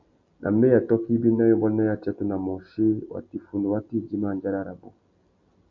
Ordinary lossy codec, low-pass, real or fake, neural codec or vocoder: Opus, 64 kbps; 7.2 kHz; real; none